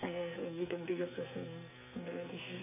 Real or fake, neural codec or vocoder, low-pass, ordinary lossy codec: fake; codec, 44.1 kHz, 2.6 kbps, SNAC; 3.6 kHz; none